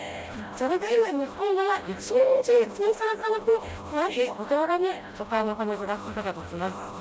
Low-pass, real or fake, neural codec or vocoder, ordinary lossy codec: none; fake; codec, 16 kHz, 0.5 kbps, FreqCodec, smaller model; none